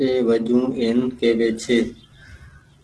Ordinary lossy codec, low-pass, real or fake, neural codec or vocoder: Opus, 16 kbps; 10.8 kHz; real; none